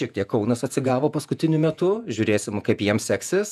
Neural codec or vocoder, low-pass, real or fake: vocoder, 48 kHz, 128 mel bands, Vocos; 14.4 kHz; fake